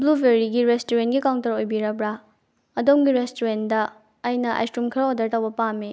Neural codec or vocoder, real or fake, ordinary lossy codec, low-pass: none; real; none; none